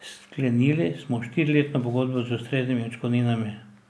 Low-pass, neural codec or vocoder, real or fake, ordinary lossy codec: none; none; real; none